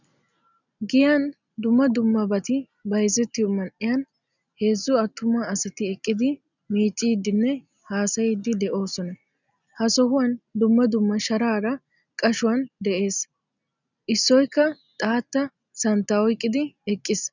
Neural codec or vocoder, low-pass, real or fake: none; 7.2 kHz; real